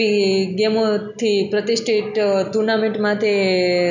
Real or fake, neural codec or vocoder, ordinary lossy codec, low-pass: real; none; none; 7.2 kHz